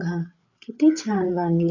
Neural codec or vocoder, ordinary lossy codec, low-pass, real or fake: codec, 16 kHz, 8 kbps, FreqCodec, larger model; none; 7.2 kHz; fake